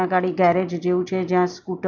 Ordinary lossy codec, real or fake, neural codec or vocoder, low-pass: none; real; none; 7.2 kHz